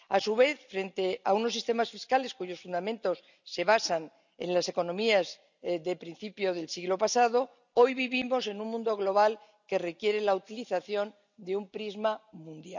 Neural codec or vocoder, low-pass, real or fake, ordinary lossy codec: none; 7.2 kHz; real; none